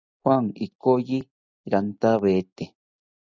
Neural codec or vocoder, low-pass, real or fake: none; 7.2 kHz; real